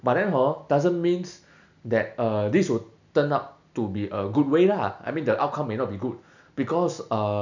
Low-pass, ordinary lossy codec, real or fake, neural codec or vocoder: 7.2 kHz; none; real; none